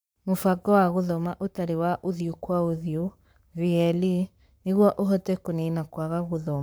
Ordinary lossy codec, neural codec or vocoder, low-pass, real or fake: none; codec, 44.1 kHz, 7.8 kbps, Pupu-Codec; none; fake